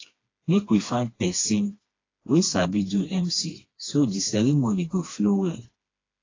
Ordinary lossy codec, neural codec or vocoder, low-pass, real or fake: AAC, 32 kbps; codec, 16 kHz, 2 kbps, FreqCodec, smaller model; 7.2 kHz; fake